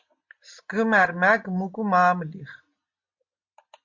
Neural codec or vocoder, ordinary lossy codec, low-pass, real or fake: none; MP3, 48 kbps; 7.2 kHz; real